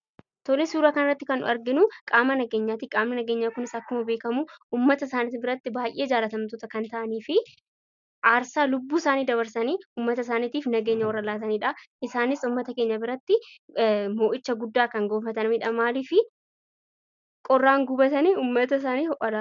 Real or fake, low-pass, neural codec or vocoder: real; 7.2 kHz; none